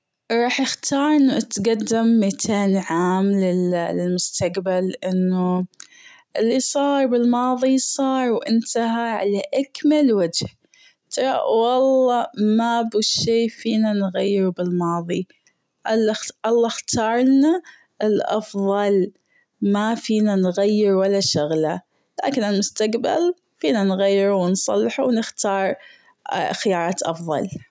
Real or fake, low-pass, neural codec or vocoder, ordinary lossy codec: real; none; none; none